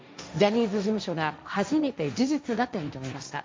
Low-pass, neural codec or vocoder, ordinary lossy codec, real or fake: none; codec, 16 kHz, 1.1 kbps, Voila-Tokenizer; none; fake